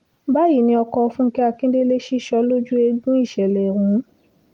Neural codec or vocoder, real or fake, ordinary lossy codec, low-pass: none; real; Opus, 24 kbps; 19.8 kHz